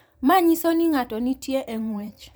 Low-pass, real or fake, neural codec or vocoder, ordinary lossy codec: none; fake; vocoder, 44.1 kHz, 128 mel bands every 512 samples, BigVGAN v2; none